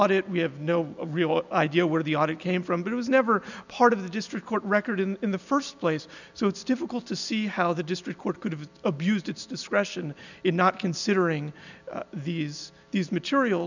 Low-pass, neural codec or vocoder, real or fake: 7.2 kHz; none; real